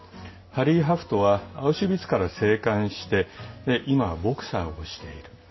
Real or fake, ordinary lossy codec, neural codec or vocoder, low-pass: real; MP3, 24 kbps; none; 7.2 kHz